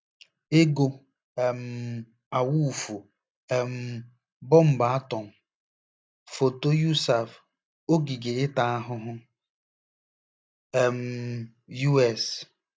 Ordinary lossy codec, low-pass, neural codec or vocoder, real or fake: none; none; none; real